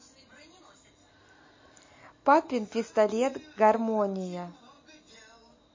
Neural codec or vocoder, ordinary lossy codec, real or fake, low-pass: none; MP3, 32 kbps; real; 7.2 kHz